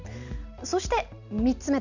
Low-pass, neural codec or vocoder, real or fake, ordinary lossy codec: 7.2 kHz; none; real; none